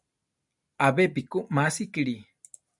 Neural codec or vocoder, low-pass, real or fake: none; 10.8 kHz; real